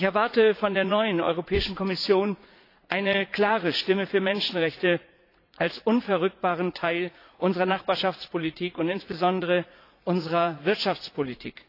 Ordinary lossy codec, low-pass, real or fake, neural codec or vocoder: AAC, 32 kbps; 5.4 kHz; fake; vocoder, 44.1 kHz, 80 mel bands, Vocos